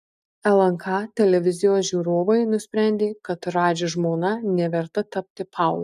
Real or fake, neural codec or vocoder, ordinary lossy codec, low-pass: real; none; MP3, 96 kbps; 14.4 kHz